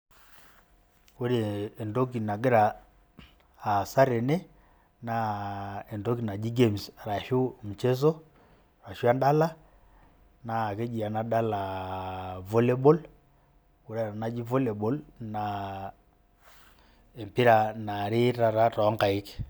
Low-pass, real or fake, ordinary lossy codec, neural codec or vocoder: none; real; none; none